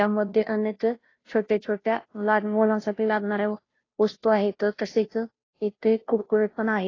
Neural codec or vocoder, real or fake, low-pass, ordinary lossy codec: codec, 16 kHz, 0.5 kbps, FunCodec, trained on Chinese and English, 25 frames a second; fake; 7.2 kHz; AAC, 32 kbps